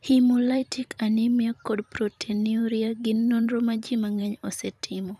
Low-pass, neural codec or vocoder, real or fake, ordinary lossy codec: 14.4 kHz; vocoder, 44.1 kHz, 128 mel bands every 512 samples, BigVGAN v2; fake; none